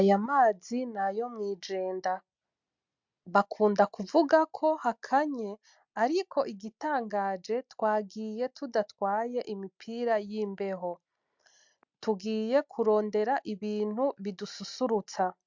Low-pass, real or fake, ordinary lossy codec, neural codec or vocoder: 7.2 kHz; real; MP3, 64 kbps; none